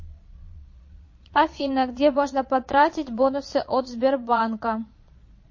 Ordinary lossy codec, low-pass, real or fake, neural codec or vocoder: MP3, 32 kbps; 7.2 kHz; fake; vocoder, 22.05 kHz, 80 mel bands, Vocos